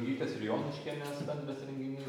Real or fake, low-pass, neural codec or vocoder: real; 19.8 kHz; none